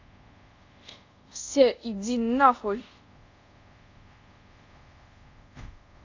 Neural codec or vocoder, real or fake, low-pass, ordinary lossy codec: codec, 24 kHz, 0.5 kbps, DualCodec; fake; 7.2 kHz; AAC, 48 kbps